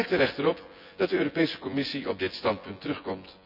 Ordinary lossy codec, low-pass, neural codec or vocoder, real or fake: none; 5.4 kHz; vocoder, 24 kHz, 100 mel bands, Vocos; fake